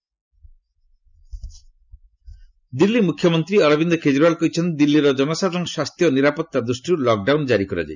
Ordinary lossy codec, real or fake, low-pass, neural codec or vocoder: none; real; 7.2 kHz; none